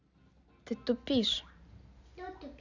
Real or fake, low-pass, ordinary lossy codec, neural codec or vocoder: real; 7.2 kHz; none; none